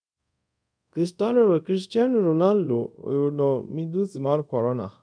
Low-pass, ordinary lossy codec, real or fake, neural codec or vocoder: 9.9 kHz; none; fake; codec, 24 kHz, 0.5 kbps, DualCodec